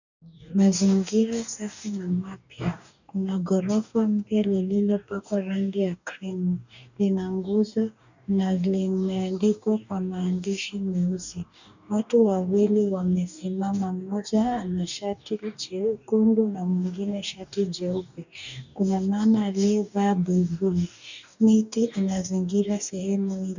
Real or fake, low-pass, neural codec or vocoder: fake; 7.2 kHz; codec, 44.1 kHz, 2.6 kbps, DAC